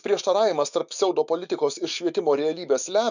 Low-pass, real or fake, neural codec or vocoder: 7.2 kHz; fake; autoencoder, 48 kHz, 128 numbers a frame, DAC-VAE, trained on Japanese speech